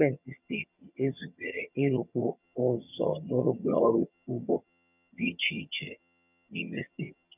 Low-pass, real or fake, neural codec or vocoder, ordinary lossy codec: 3.6 kHz; fake; vocoder, 22.05 kHz, 80 mel bands, HiFi-GAN; none